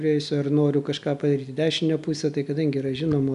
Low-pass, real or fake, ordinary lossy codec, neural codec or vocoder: 10.8 kHz; real; AAC, 96 kbps; none